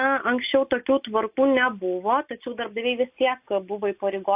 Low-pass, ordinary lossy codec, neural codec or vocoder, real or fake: 3.6 kHz; AAC, 32 kbps; none; real